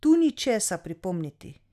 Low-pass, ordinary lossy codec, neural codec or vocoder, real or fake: 14.4 kHz; none; none; real